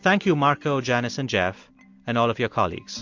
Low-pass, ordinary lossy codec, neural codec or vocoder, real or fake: 7.2 kHz; MP3, 48 kbps; none; real